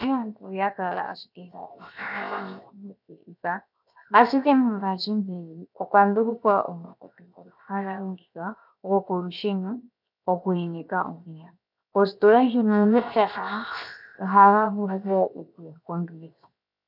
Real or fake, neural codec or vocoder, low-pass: fake; codec, 16 kHz, 0.7 kbps, FocalCodec; 5.4 kHz